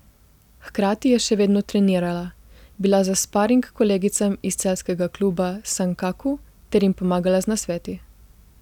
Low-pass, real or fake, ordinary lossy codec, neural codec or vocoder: 19.8 kHz; real; none; none